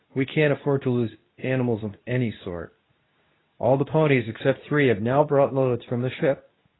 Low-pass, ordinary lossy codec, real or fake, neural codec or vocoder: 7.2 kHz; AAC, 16 kbps; fake; codec, 24 kHz, 0.9 kbps, WavTokenizer, medium speech release version 2